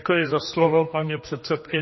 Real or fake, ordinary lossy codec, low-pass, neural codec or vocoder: fake; MP3, 24 kbps; 7.2 kHz; codec, 16 kHz, 2 kbps, X-Codec, HuBERT features, trained on general audio